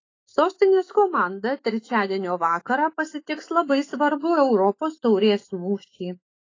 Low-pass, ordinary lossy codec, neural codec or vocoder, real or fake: 7.2 kHz; AAC, 32 kbps; autoencoder, 48 kHz, 128 numbers a frame, DAC-VAE, trained on Japanese speech; fake